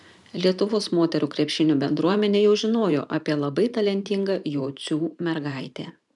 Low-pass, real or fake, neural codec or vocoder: 10.8 kHz; fake; vocoder, 44.1 kHz, 128 mel bands every 512 samples, BigVGAN v2